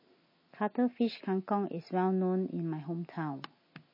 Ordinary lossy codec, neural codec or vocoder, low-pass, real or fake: MP3, 24 kbps; none; 5.4 kHz; real